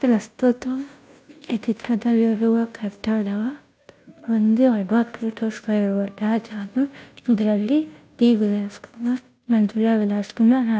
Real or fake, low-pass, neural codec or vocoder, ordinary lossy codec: fake; none; codec, 16 kHz, 0.5 kbps, FunCodec, trained on Chinese and English, 25 frames a second; none